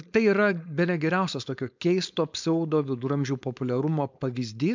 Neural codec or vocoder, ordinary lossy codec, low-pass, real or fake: codec, 16 kHz, 4.8 kbps, FACodec; MP3, 64 kbps; 7.2 kHz; fake